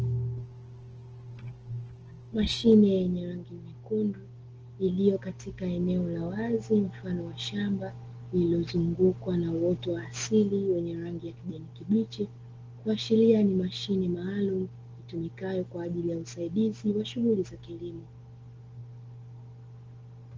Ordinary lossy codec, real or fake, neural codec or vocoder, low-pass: Opus, 16 kbps; real; none; 7.2 kHz